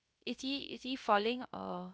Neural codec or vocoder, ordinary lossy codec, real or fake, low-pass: codec, 16 kHz, 0.3 kbps, FocalCodec; none; fake; none